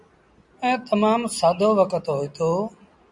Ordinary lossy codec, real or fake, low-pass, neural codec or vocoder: MP3, 96 kbps; real; 10.8 kHz; none